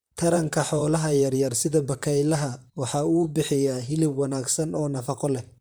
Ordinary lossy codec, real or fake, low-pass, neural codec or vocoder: none; fake; none; vocoder, 44.1 kHz, 128 mel bands, Pupu-Vocoder